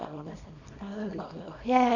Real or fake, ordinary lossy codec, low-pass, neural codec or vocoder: fake; none; 7.2 kHz; codec, 24 kHz, 0.9 kbps, WavTokenizer, small release